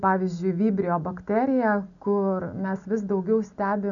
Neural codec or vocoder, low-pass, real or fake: none; 7.2 kHz; real